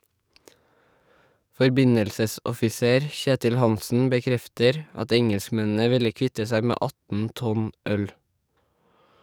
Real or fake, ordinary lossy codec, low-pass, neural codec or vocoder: fake; none; none; codec, 44.1 kHz, 7.8 kbps, DAC